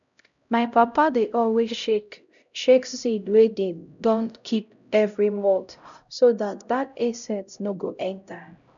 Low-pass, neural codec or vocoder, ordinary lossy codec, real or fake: 7.2 kHz; codec, 16 kHz, 0.5 kbps, X-Codec, HuBERT features, trained on LibriSpeech; none; fake